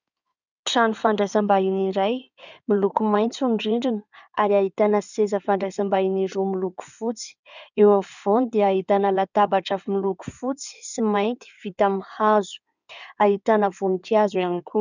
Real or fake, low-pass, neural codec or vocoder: fake; 7.2 kHz; codec, 16 kHz in and 24 kHz out, 2.2 kbps, FireRedTTS-2 codec